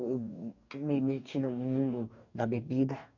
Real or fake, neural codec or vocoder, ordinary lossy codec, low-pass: fake; codec, 44.1 kHz, 2.6 kbps, DAC; none; 7.2 kHz